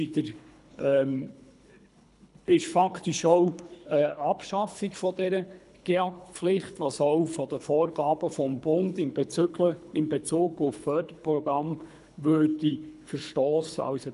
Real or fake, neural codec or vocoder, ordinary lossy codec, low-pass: fake; codec, 24 kHz, 3 kbps, HILCodec; none; 10.8 kHz